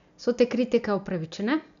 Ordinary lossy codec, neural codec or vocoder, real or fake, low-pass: none; none; real; 7.2 kHz